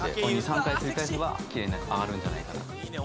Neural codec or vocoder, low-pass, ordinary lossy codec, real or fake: none; none; none; real